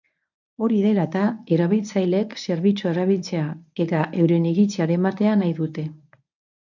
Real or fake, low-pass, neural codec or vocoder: fake; 7.2 kHz; codec, 24 kHz, 0.9 kbps, WavTokenizer, medium speech release version 1